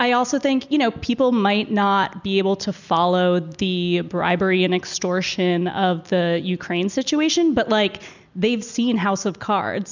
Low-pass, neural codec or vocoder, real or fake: 7.2 kHz; none; real